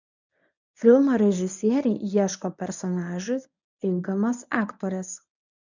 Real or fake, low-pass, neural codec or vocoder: fake; 7.2 kHz; codec, 24 kHz, 0.9 kbps, WavTokenizer, medium speech release version 1